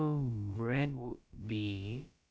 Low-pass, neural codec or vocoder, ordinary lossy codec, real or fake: none; codec, 16 kHz, about 1 kbps, DyCAST, with the encoder's durations; none; fake